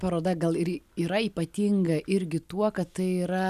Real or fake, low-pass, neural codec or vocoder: real; 14.4 kHz; none